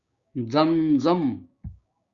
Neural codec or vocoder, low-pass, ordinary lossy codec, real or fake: codec, 16 kHz, 6 kbps, DAC; 7.2 kHz; Opus, 64 kbps; fake